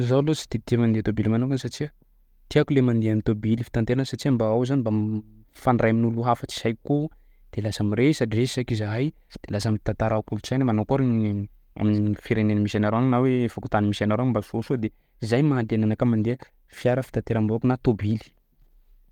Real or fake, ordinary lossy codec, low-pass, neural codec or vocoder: real; Opus, 32 kbps; 19.8 kHz; none